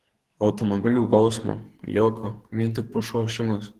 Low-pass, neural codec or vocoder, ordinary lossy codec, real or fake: 14.4 kHz; codec, 44.1 kHz, 2.6 kbps, SNAC; Opus, 16 kbps; fake